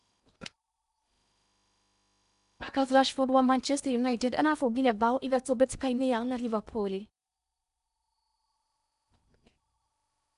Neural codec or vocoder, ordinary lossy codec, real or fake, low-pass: codec, 16 kHz in and 24 kHz out, 0.8 kbps, FocalCodec, streaming, 65536 codes; none; fake; 10.8 kHz